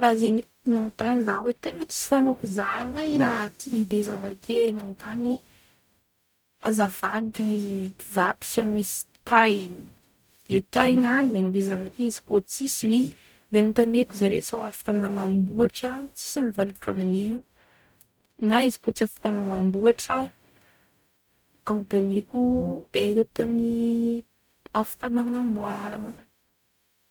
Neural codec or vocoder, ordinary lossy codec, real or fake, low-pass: codec, 44.1 kHz, 0.9 kbps, DAC; none; fake; none